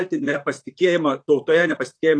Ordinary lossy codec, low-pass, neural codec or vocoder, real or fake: AAC, 48 kbps; 9.9 kHz; vocoder, 44.1 kHz, 128 mel bands, Pupu-Vocoder; fake